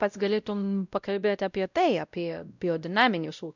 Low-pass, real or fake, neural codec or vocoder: 7.2 kHz; fake; codec, 16 kHz, 0.5 kbps, X-Codec, WavLM features, trained on Multilingual LibriSpeech